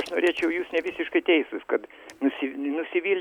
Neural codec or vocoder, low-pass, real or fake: none; 19.8 kHz; real